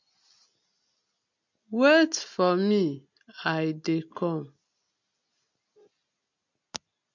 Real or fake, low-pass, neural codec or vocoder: real; 7.2 kHz; none